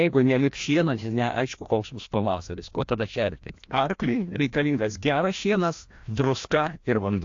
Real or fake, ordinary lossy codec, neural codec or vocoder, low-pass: fake; AAC, 48 kbps; codec, 16 kHz, 1 kbps, FreqCodec, larger model; 7.2 kHz